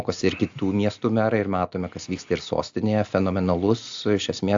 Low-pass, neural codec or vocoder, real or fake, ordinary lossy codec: 7.2 kHz; none; real; AAC, 64 kbps